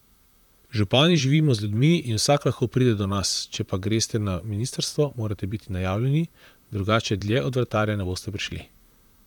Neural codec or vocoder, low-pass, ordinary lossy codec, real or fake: vocoder, 44.1 kHz, 128 mel bands, Pupu-Vocoder; 19.8 kHz; none; fake